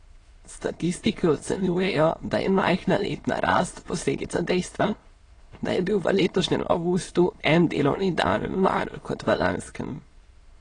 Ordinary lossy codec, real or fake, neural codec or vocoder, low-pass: AAC, 32 kbps; fake; autoencoder, 22.05 kHz, a latent of 192 numbers a frame, VITS, trained on many speakers; 9.9 kHz